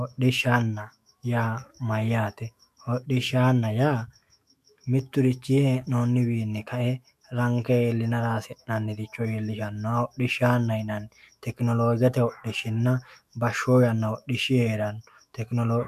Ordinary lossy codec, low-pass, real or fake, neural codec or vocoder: MP3, 96 kbps; 14.4 kHz; fake; autoencoder, 48 kHz, 128 numbers a frame, DAC-VAE, trained on Japanese speech